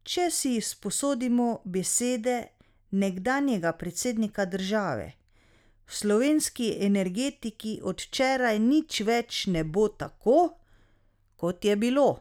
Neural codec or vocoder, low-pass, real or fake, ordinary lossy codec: none; 19.8 kHz; real; none